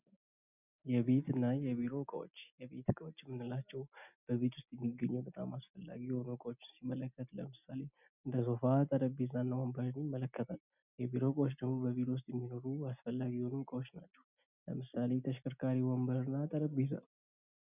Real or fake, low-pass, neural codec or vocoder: real; 3.6 kHz; none